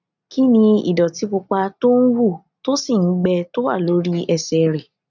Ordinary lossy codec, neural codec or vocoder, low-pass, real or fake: none; none; 7.2 kHz; real